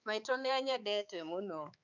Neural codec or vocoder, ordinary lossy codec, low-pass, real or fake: codec, 16 kHz, 4 kbps, X-Codec, HuBERT features, trained on balanced general audio; none; 7.2 kHz; fake